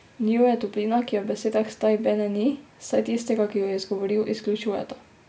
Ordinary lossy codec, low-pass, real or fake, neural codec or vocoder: none; none; real; none